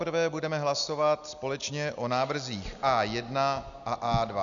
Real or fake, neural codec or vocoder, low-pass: real; none; 7.2 kHz